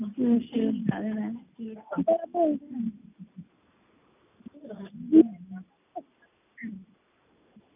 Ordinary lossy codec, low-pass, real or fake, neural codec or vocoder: none; 3.6 kHz; real; none